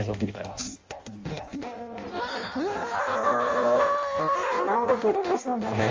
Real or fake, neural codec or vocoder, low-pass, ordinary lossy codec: fake; codec, 16 kHz in and 24 kHz out, 0.6 kbps, FireRedTTS-2 codec; 7.2 kHz; Opus, 32 kbps